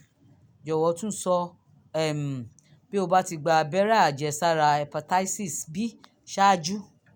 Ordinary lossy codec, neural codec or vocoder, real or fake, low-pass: none; none; real; none